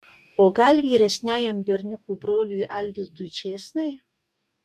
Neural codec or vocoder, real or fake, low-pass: codec, 44.1 kHz, 2.6 kbps, DAC; fake; 14.4 kHz